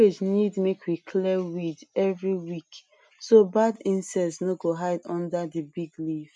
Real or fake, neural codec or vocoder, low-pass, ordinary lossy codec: real; none; 10.8 kHz; none